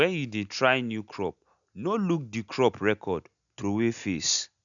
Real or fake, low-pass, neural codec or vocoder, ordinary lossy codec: real; 7.2 kHz; none; none